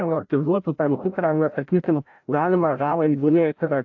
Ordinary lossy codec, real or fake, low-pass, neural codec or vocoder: AAC, 48 kbps; fake; 7.2 kHz; codec, 16 kHz, 0.5 kbps, FreqCodec, larger model